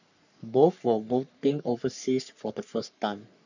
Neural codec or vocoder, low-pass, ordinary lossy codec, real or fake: codec, 44.1 kHz, 3.4 kbps, Pupu-Codec; 7.2 kHz; none; fake